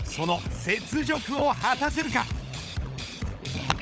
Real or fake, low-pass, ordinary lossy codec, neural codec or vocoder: fake; none; none; codec, 16 kHz, 16 kbps, FunCodec, trained on LibriTTS, 50 frames a second